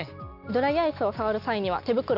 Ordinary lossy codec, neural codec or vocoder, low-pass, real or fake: none; none; 5.4 kHz; real